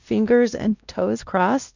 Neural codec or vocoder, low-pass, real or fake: codec, 16 kHz, 1 kbps, X-Codec, WavLM features, trained on Multilingual LibriSpeech; 7.2 kHz; fake